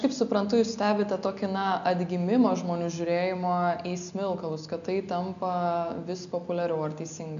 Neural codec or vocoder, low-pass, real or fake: none; 7.2 kHz; real